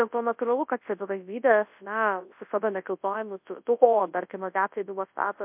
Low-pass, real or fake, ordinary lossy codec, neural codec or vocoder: 3.6 kHz; fake; MP3, 32 kbps; codec, 24 kHz, 0.9 kbps, WavTokenizer, large speech release